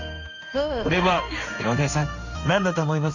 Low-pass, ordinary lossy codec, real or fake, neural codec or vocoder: 7.2 kHz; none; fake; codec, 16 kHz in and 24 kHz out, 1 kbps, XY-Tokenizer